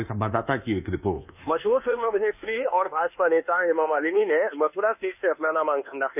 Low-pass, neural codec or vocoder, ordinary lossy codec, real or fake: 3.6 kHz; codec, 24 kHz, 1.2 kbps, DualCodec; none; fake